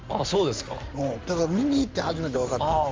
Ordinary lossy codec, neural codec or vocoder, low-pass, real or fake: Opus, 32 kbps; codec, 16 kHz in and 24 kHz out, 2.2 kbps, FireRedTTS-2 codec; 7.2 kHz; fake